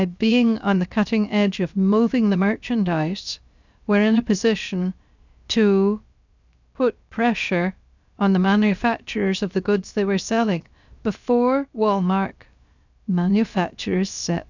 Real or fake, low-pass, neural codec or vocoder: fake; 7.2 kHz; codec, 16 kHz, about 1 kbps, DyCAST, with the encoder's durations